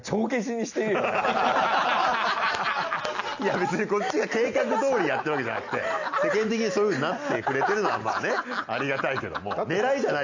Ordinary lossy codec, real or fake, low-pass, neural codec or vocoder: none; real; 7.2 kHz; none